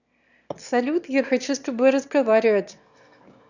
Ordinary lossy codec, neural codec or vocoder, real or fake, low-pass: none; autoencoder, 22.05 kHz, a latent of 192 numbers a frame, VITS, trained on one speaker; fake; 7.2 kHz